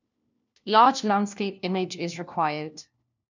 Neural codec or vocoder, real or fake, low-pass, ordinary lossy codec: codec, 16 kHz, 1 kbps, FunCodec, trained on LibriTTS, 50 frames a second; fake; 7.2 kHz; none